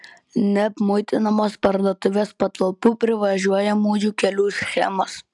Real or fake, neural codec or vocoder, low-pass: real; none; 10.8 kHz